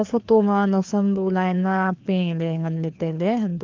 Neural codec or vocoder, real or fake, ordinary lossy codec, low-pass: codec, 16 kHz, 2 kbps, FreqCodec, larger model; fake; Opus, 24 kbps; 7.2 kHz